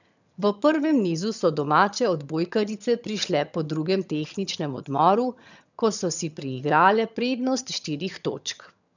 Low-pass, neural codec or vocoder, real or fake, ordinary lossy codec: 7.2 kHz; vocoder, 22.05 kHz, 80 mel bands, HiFi-GAN; fake; none